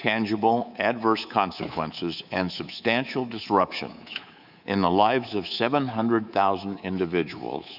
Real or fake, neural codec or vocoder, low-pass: fake; codec, 24 kHz, 3.1 kbps, DualCodec; 5.4 kHz